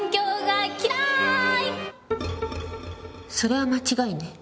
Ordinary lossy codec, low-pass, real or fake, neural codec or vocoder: none; none; real; none